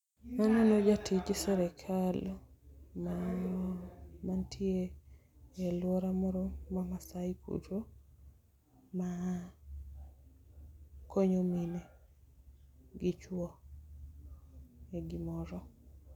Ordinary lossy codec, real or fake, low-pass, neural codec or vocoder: none; real; 19.8 kHz; none